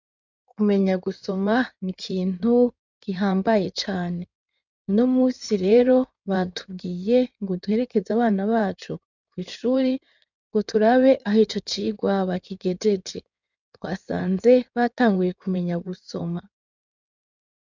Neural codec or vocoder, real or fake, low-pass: codec, 16 kHz in and 24 kHz out, 2.2 kbps, FireRedTTS-2 codec; fake; 7.2 kHz